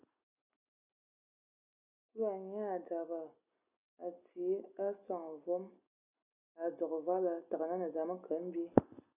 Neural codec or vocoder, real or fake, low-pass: none; real; 3.6 kHz